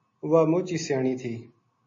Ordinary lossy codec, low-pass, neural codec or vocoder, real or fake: MP3, 32 kbps; 7.2 kHz; none; real